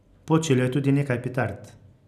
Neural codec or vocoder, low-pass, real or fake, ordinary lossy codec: none; 14.4 kHz; real; none